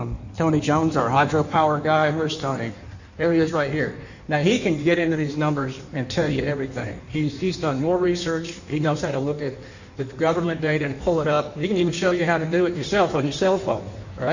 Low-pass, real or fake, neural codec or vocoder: 7.2 kHz; fake; codec, 16 kHz in and 24 kHz out, 1.1 kbps, FireRedTTS-2 codec